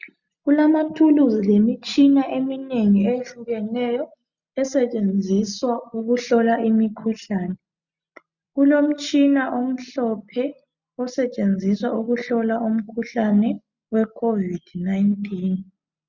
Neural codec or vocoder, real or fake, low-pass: none; real; 7.2 kHz